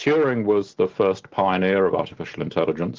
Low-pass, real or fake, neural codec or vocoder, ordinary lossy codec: 7.2 kHz; real; none; Opus, 16 kbps